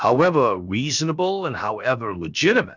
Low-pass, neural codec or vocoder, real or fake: 7.2 kHz; codec, 16 kHz, about 1 kbps, DyCAST, with the encoder's durations; fake